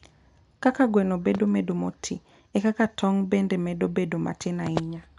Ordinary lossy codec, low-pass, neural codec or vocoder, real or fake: none; 10.8 kHz; none; real